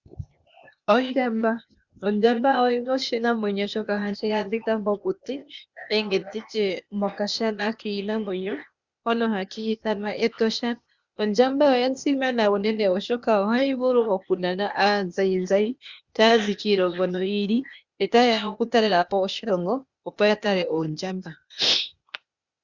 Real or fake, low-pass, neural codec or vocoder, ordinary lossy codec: fake; 7.2 kHz; codec, 16 kHz, 0.8 kbps, ZipCodec; Opus, 64 kbps